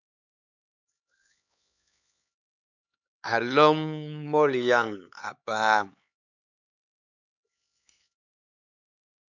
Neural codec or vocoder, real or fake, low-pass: codec, 16 kHz, 4 kbps, X-Codec, HuBERT features, trained on LibriSpeech; fake; 7.2 kHz